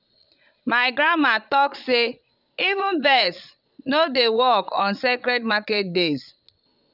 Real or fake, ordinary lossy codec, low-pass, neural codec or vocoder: fake; none; 5.4 kHz; vocoder, 22.05 kHz, 80 mel bands, Vocos